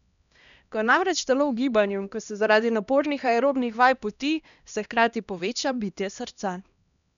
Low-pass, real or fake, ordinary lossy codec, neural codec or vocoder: 7.2 kHz; fake; none; codec, 16 kHz, 1 kbps, X-Codec, HuBERT features, trained on LibriSpeech